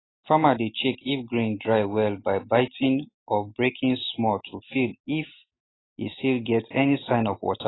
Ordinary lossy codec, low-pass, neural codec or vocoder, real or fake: AAC, 16 kbps; 7.2 kHz; none; real